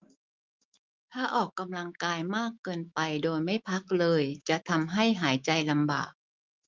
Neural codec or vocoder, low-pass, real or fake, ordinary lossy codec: autoencoder, 48 kHz, 128 numbers a frame, DAC-VAE, trained on Japanese speech; 7.2 kHz; fake; Opus, 24 kbps